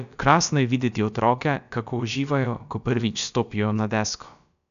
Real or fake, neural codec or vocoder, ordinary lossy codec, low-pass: fake; codec, 16 kHz, about 1 kbps, DyCAST, with the encoder's durations; none; 7.2 kHz